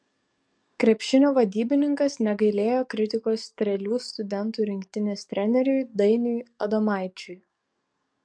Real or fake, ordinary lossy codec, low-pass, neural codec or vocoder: fake; MP3, 64 kbps; 9.9 kHz; codec, 44.1 kHz, 7.8 kbps, DAC